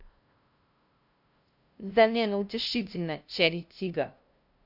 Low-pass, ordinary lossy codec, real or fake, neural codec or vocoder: 5.4 kHz; none; fake; codec, 16 kHz, 0.5 kbps, FunCodec, trained on LibriTTS, 25 frames a second